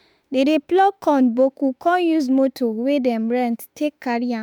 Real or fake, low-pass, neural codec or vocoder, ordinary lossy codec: fake; none; autoencoder, 48 kHz, 32 numbers a frame, DAC-VAE, trained on Japanese speech; none